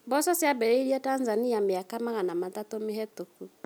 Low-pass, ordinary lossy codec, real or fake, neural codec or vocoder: none; none; real; none